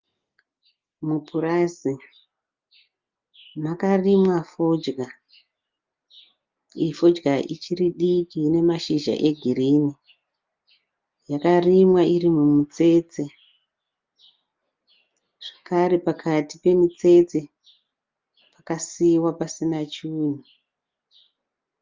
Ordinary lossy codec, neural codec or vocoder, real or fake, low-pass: Opus, 24 kbps; none; real; 7.2 kHz